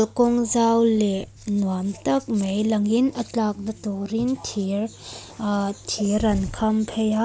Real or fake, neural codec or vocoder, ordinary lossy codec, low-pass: real; none; none; none